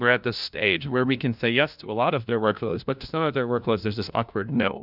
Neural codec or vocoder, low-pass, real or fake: codec, 16 kHz, 1 kbps, FunCodec, trained on LibriTTS, 50 frames a second; 5.4 kHz; fake